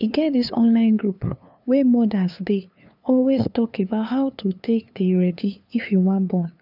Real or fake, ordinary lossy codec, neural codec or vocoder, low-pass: fake; none; codec, 16 kHz, 2 kbps, FunCodec, trained on LibriTTS, 25 frames a second; 5.4 kHz